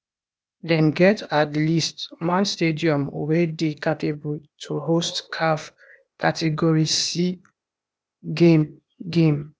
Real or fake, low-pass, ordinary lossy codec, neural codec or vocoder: fake; none; none; codec, 16 kHz, 0.8 kbps, ZipCodec